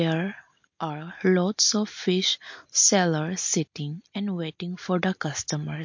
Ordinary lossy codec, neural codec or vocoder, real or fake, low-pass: MP3, 48 kbps; none; real; 7.2 kHz